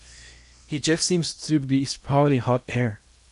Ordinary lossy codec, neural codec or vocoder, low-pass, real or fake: MP3, 96 kbps; codec, 16 kHz in and 24 kHz out, 0.8 kbps, FocalCodec, streaming, 65536 codes; 10.8 kHz; fake